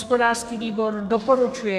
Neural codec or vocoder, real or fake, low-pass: codec, 32 kHz, 1.9 kbps, SNAC; fake; 14.4 kHz